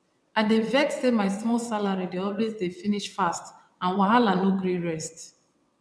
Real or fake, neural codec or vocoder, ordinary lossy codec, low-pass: fake; vocoder, 22.05 kHz, 80 mel bands, WaveNeXt; none; none